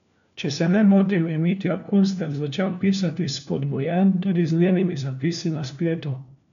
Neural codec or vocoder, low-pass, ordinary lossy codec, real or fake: codec, 16 kHz, 1 kbps, FunCodec, trained on LibriTTS, 50 frames a second; 7.2 kHz; none; fake